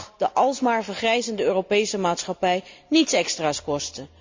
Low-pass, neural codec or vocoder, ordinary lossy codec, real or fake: 7.2 kHz; none; MP3, 64 kbps; real